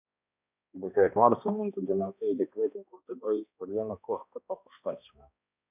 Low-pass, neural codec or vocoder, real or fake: 3.6 kHz; codec, 16 kHz, 1 kbps, X-Codec, HuBERT features, trained on balanced general audio; fake